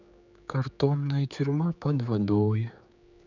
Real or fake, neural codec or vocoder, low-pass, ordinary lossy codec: fake; codec, 16 kHz, 4 kbps, X-Codec, HuBERT features, trained on general audio; 7.2 kHz; none